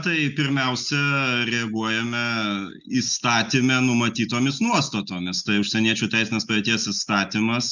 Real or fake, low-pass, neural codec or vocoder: real; 7.2 kHz; none